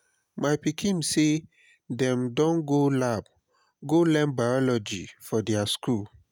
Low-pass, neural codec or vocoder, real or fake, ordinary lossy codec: 19.8 kHz; none; real; none